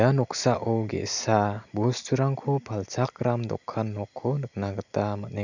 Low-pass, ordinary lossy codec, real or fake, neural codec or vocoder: 7.2 kHz; none; real; none